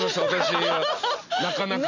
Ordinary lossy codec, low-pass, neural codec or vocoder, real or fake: none; 7.2 kHz; none; real